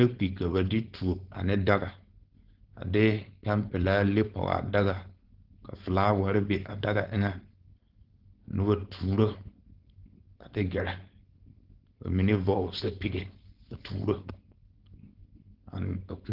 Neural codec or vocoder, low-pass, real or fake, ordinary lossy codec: codec, 16 kHz, 4.8 kbps, FACodec; 5.4 kHz; fake; Opus, 16 kbps